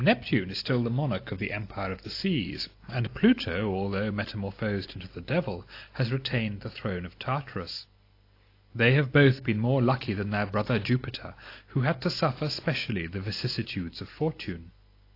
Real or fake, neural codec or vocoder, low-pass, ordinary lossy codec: real; none; 5.4 kHz; AAC, 32 kbps